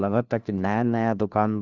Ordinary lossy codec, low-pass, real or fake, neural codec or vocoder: Opus, 32 kbps; 7.2 kHz; fake; codec, 16 kHz, 1 kbps, FunCodec, trained on LibriTTS, 50 frames a second